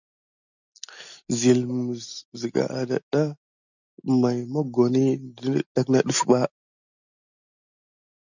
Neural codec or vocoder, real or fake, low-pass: none; real; 7.2 kHz